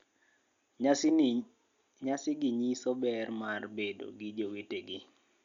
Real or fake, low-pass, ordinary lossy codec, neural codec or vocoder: real; 7.2 kHz; Opus, 64 kbps; none